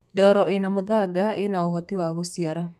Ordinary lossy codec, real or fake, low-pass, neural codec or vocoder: none; fake; 14.4 kHz; codec, 32 kHz, 1.9 kbps, SNAC